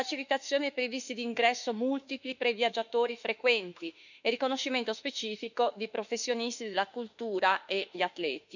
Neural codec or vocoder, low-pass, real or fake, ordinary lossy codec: autoencoder, 48 kHz, 32 numbers a frame, DAC-VAE, trained on Japanese speech; 7.2 kHz; fake; none